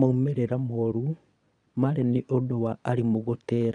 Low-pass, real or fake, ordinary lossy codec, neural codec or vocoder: 9.9 kHz; fake; none; vocoder, 22.05 kHz, 80 mel bands, Vocos